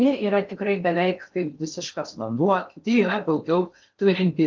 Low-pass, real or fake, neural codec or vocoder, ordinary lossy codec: 7.2 kHz; fake; codec, 16 kHz in and 24 kHz out, 0.6 kbps, FocalCodec, streaming, 4096 codes; Opus, 24 kbps